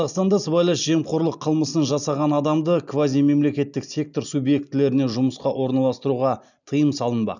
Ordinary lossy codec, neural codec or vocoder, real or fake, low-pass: none; none; real; 7.2 kHz